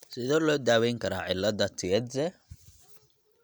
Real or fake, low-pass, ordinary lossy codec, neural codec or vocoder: real; none; none; none